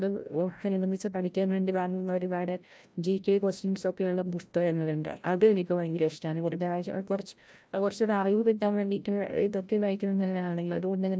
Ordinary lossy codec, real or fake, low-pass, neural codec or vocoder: none; fake; none; codec, 16 kHz, 0.5 kbps, FreqCodec, larger model